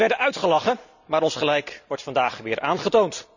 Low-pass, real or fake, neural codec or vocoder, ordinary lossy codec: 7.2 kHz; real; none; none